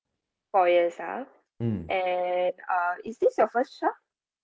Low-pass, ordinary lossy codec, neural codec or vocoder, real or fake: none; none; none; real